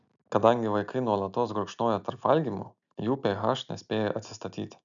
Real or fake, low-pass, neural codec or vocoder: real; 7.2 kHz; none